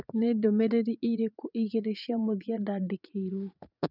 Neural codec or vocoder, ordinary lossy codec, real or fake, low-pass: vocoder, 44.1 kHz, 80 mel bands, Vocos; none; fake; 5.4 kHz